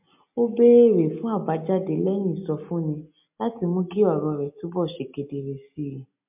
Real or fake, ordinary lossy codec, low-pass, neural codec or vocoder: real; MP3, 32 kbps; 3.6 kHz; none